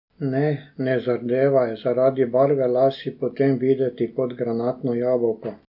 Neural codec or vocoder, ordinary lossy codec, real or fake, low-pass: none; none; real; 5.4 kHz